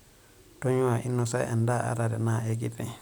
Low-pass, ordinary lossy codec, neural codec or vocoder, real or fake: none; none; none; real